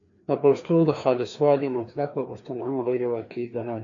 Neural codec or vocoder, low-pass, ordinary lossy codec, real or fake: codec, 16 kHz, 2 kbps, FreqCodec, larger model; 7.2 kHz; AAC, 64 kbps; fake